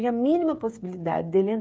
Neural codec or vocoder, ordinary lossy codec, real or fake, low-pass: codec, 16 kHz, 8 kbps, FreqCodec, smaller model; none; fake; none